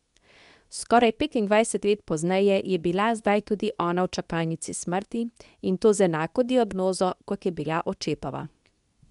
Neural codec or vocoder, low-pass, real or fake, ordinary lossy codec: codec, 24 kHz, 0.9 kbps, WavTokenizer, medium speech release version 2; 10.8 kHz; fake; none